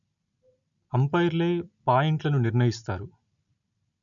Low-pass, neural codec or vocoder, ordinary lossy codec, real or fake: 7.2 kHz; none; none; real